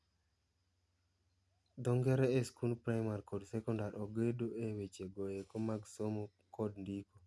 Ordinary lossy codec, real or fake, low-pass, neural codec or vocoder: none; real; none; none